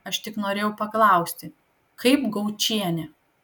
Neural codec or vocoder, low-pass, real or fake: none; 19.8 kHz; real